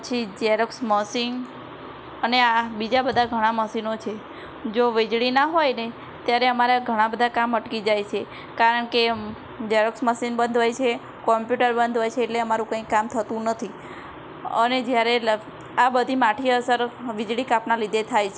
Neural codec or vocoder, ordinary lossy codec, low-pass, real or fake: none; none; none; real